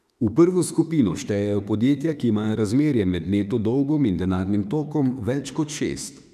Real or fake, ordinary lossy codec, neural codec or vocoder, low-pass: fake; none; autoencoder, 48 kHz, 32 numbers a frame, DAC-VAE, trained on Japanese speech; 14.4 kHz